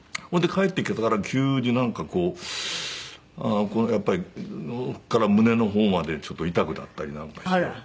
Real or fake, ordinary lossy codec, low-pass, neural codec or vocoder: real; none; none; none